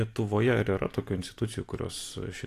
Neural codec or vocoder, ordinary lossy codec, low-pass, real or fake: none; AAC, 64 kbps; 14.4 kHz; real